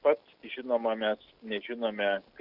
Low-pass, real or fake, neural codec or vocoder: 5.4 kHz; real; none